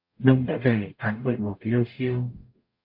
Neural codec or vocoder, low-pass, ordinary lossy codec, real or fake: codec, 44.1 kHz, 0.9 kbps, DAC; 5.4 kHz; MP3, 32 kbps; fake